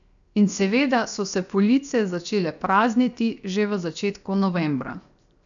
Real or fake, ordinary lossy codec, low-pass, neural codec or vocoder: fake; none; 7.2 kHz; codec, 16 kHz, 0.7 kbps, FocalCodec